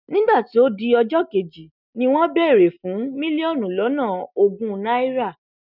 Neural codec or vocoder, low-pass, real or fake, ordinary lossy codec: none; 5.4 kHz; real; none